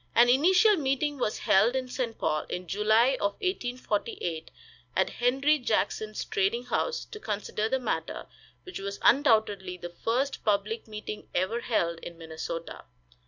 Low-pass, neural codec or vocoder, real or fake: 7.2 kHz; none; real